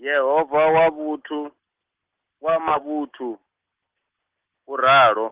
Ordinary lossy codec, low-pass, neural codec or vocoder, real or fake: Opus, 16 kbps; 3.6 kHz; none; real